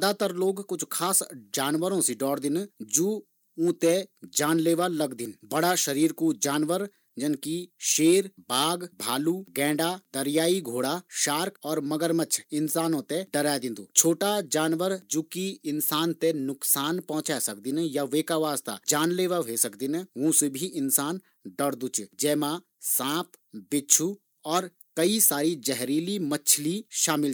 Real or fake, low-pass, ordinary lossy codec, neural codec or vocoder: real; none; none; none